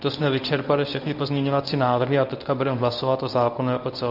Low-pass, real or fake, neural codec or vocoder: 5.4 kHz; fake; codec, 24 kHz, 0.9 kbps, WavTokenizer, medium speech release version 1